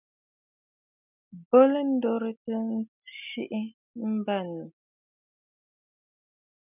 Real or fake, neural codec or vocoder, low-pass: real; none; 3.6 kHz